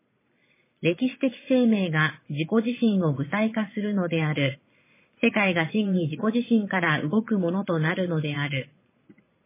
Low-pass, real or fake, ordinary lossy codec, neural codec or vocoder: 3.6 kHz; fake; MP3, 16 kbps; vocoder, 22.05 kHz, 80 mel bands, Vocos